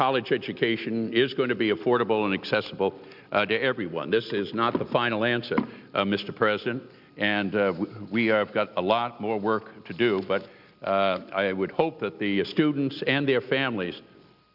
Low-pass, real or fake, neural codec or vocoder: 5.4 kHz; real; none